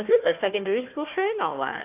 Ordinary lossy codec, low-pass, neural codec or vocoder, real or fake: AAC, 32 kbps; 3.6 kHz; codec, 16 kHz, 1 kbps, FunCodec, trained on Chinese and English, 50 frames a second; fake